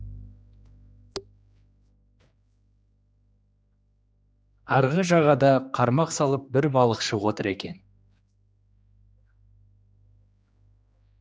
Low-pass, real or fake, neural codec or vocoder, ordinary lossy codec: none; fake; codec, 16 kHz, 2 kbps, X-Codec, HuBERT features, trained on general audio; none